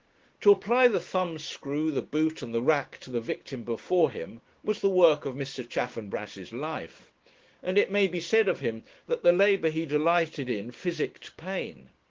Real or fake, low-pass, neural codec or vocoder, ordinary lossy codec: fake; 7.2 kHz; codec, 16 kHz, 6 kbps, DAC; Opus, 16 kbps